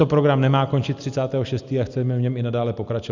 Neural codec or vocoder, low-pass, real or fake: none; 7.2 kHz; real